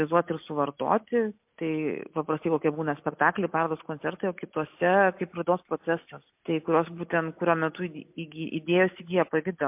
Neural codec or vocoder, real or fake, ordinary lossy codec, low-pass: none; real; MP3, 32 kbps; 3.6 kHz